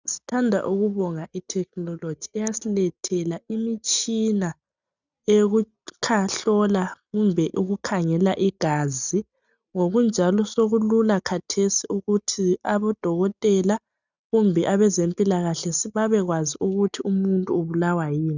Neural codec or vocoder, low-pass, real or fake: none; 7.2 kHz; real